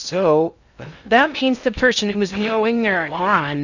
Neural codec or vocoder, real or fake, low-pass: codec, 16 kHz in and 24 kHz out, 0.6 kbps, FocalCodec, streaming, 2048 codes; fake; 7.2 kHz